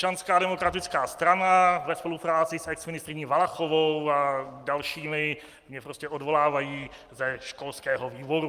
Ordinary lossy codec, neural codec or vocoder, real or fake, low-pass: Opus, 32 kbps; none; real; 14.4 kHz